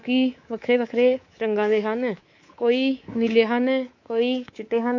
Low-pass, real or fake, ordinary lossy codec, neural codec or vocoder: 7.2 kHz; fake; AAC, 48 kbps; codec, 24 kHz, 3.1 kbps, DualCodec